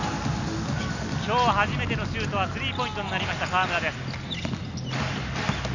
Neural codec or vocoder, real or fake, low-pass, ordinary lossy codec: none; real; 7.2 kHz; none